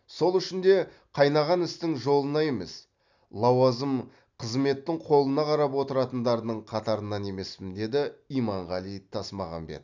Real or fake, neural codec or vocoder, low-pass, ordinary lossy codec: real; none; 7.2 kHz; none